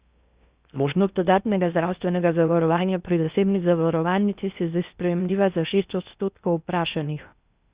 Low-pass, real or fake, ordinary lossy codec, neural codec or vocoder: 3.6 kHz; fake; Opus, 64 kbps; codec, 16 kHz in and 24 kHz out, 0.6 kbps, FocalCodec, streaming, 4096 codes